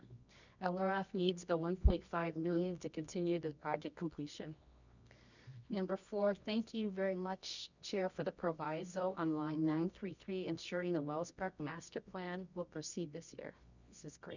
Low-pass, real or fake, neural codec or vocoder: 7.2 kHz; fake; codec, 24 kHz, 0.9 kbps, WavTokenizer, medium music audio release